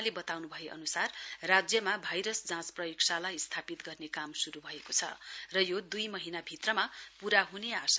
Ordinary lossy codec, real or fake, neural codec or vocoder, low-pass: none; real; none; none